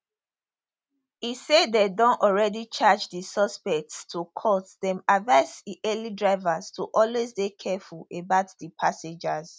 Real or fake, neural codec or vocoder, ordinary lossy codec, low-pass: real; none; none; none